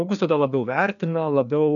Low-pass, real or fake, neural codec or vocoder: 7.2 kHz; fake; codec, 16 kHz, 2 kbps, FreqCodec, larger model